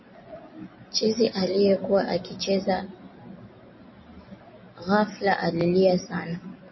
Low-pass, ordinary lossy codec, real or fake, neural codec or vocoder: 7.2 kHz; MP3, 24 kbps; real; none